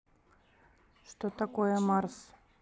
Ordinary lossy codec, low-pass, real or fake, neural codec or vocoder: none; none; real; none